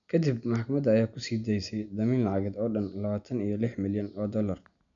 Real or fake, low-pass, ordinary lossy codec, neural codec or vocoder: real; 7.2 kHz; MP3, 96 kbps; none